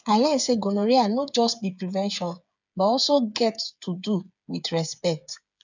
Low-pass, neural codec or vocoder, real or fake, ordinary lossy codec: 7.2 kHz; codec, 16 kHz, 8 kbps, FreqCodec, smaller model; fake; none